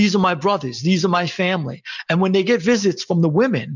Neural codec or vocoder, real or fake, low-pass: none; real; 7.2 kHz